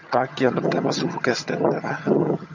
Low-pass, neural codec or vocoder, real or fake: 7.2 kHz; vocoder, 22.05 kHz, 80 mel bands, HiFi-GAN; fake